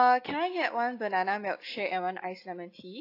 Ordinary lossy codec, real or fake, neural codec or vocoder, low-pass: AAC, 32 kbps; real; none; 5.4 kHz